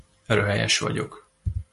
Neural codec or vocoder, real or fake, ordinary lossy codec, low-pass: none; real; MP3, 48 kbps; 14.4 kHz